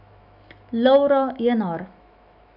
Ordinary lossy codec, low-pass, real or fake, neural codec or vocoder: none; 5.4 kHz; fake; autoencoder, 48 kHz, 128 numbers a frame, DAC-VAE, trained on Japanese speech